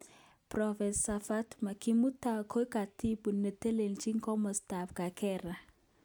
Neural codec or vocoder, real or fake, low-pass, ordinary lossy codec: none; real; none; none